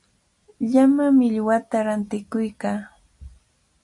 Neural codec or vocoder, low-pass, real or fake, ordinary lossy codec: none; 10.8 kHz; real; AAC, 64 kbps